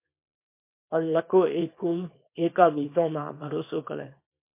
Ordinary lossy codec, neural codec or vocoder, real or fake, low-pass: MP3, 24 kbps; codec, 24 kHz, 0.9 kbps, WavTokenizer, small release; fake; 3.6 kHz